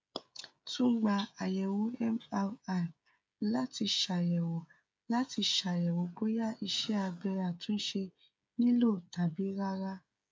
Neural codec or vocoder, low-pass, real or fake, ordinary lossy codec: codec, 16 kHz, 16 kbps, FreqCodec, smaller model; none; fake; none